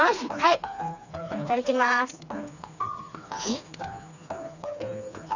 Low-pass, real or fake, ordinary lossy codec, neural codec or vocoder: 7.2 kHz; fake; none; codec, 16 kHz, 2 kbps, FreqCodec, smaller model